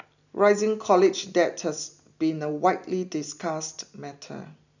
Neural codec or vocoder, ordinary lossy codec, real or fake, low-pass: none; none; real; 7.2 kHz